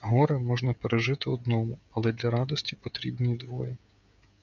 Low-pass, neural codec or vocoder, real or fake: 7.2 kHz; codec, 16 kHz, 16 kbps, FreqCodec, smaller model; fake